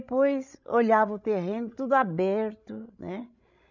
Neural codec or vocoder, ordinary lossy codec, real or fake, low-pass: codec, 16 kHz, 16 kbps, FreqCodec, larger model; none; fake; 7.2 kHz